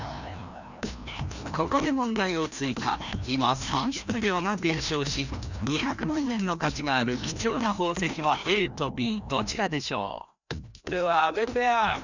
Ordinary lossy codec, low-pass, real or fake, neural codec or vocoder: none; 7.2 kHz; fake; codec, 16 kHz, 1 kbps, FreqCodec, larger model